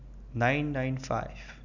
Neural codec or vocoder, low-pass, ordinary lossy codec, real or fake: none; 7.2 kHz; none; real